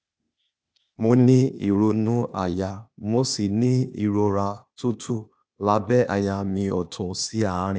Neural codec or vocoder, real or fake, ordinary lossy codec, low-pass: codec, 16 kHz, 0.8 kbps, ZipCodec; fake; none; none